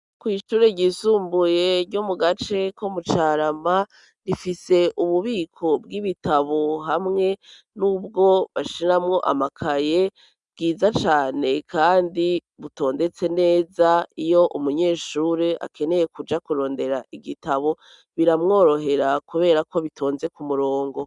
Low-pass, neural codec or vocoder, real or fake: 10.8 kHz; none; real